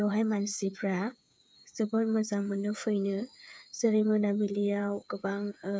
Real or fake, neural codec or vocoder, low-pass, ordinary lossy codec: fake; codec, 16 kHz, 8 kbps, FreqCodec, smaller model; none; none